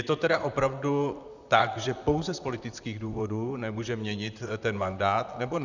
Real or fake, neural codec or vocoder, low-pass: fake; vocoder, 44.1 kHz, 128 mel bands, Pupu-Vocoder; 7.2 kHz